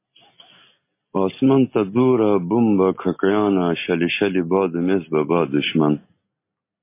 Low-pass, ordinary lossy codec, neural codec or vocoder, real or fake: 3.6 kHz; MP3, 24 kbps; none; real